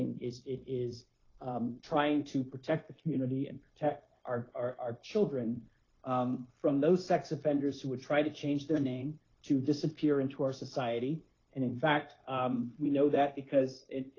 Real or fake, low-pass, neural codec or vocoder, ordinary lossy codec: fake; 7.2 kHz; codec, 16 kHz, 0.9 kbps, LongCat-Audio-Codec; AAC, 32 kbps